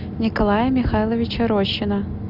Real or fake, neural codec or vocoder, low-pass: real; none; 5.4 kHz